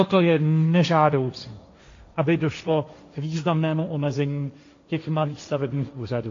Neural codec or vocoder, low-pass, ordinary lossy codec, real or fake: codec, 16 kHz, 1.1 kbps, Voila-Tokenizer; 7.2 kHz; AAC, 48 kbps; fake